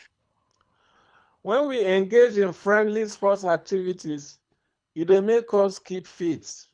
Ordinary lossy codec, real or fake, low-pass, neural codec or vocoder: none; fake; 9.9 kHz; codec, 24 kHz, 3 kbps, HILCodec